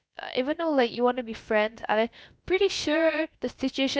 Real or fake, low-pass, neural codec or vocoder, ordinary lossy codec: fake; none; codec, 16 kHz, about 1 kbps, DyCAST, with the encoder's durations; none